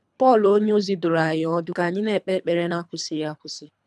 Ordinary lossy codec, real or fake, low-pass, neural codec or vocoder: none; fake; none; codec, 24 kHz, 3 kbps, HILCodec